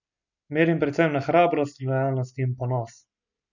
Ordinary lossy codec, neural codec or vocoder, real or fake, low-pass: none; none; real; 7.2 kHz